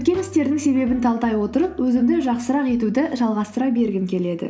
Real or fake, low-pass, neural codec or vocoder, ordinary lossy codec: real; none; none; none